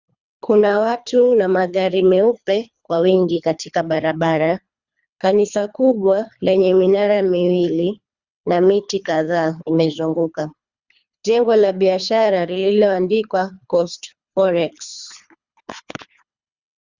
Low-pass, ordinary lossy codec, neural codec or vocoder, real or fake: 7.2 kHz; Opus, 64 kbps; codec, 24 kHz, 3 kbps, HILCodec; fake